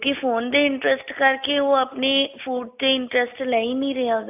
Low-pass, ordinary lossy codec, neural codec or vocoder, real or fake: 3.6 kHz; AAC, 32 kbps; none; real